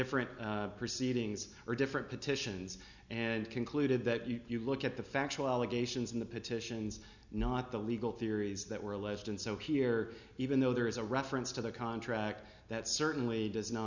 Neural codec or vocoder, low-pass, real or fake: none; 7.2 kHz; real